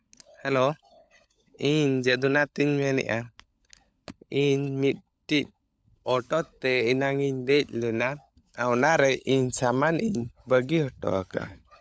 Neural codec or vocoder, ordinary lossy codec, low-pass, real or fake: codec, 16 kHz, 16 kbps, FunCodec, trained on LibriTTS, 50 frames a second; none; none; fake